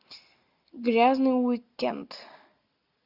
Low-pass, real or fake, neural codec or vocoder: 5.4 kHz; real; none